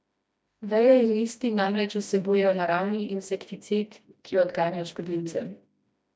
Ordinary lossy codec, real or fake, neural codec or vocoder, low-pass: none; fake; codec, 16 kHz, 1 kbps, FreqCodec, smaller model; none